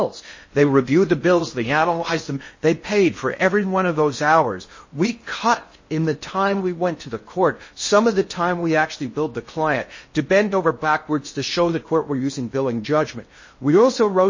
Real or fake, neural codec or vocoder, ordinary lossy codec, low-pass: fake; codec, 16 kHz in and 24 kHz out, 0.6 kbps, FocalCodec, streaming, 4096 codes; MP3, 32 kbps; 7.2 kHz